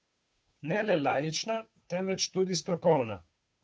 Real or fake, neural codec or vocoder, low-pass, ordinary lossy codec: fake; codec, 16 kHz, 2 kbps, FunCodec, trained on Chinese and English, 25 frames a second; none; none